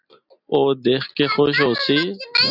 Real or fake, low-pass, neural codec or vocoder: real; 5.4 kHz; none